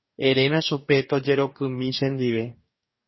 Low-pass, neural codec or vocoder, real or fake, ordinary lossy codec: 7.2 kHz; codec, 44.1 kHz, 2.6 kbps, DAC; fake; MP3, 24 kbps